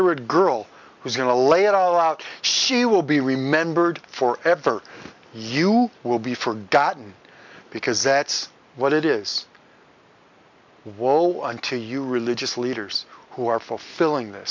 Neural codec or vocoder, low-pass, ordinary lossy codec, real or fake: none; 7.2 kHz; MP3, 64 kbps; real